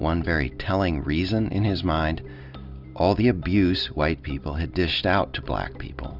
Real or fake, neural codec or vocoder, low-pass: real; none; 5.4 kHz